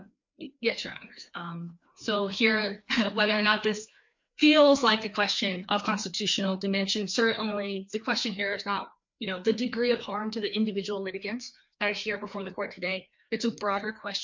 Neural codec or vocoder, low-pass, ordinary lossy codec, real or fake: codec, 16 kHz, 2 kbps, FreqCodec, larger model; 7.2 kHz; MP3, 48 kbps; fake